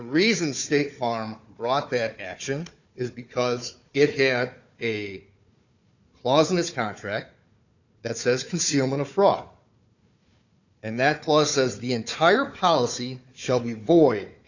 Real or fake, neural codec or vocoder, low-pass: fake; codec, 16 kHz, 4 kbps, FunCodec, trained on Chinese and English, 50 frames a second; 7.2 kHz